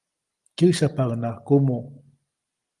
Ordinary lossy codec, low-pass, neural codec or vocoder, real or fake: Opus, 24 kbps; 10.8 kHz; none; real